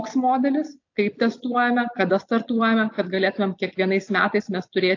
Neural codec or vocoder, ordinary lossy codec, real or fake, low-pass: none; AAC, 48 kbps; real; 7.2 kHz